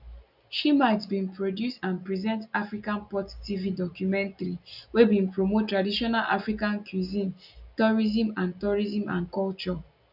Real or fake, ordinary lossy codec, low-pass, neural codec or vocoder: real; none; 5.4 kHz; none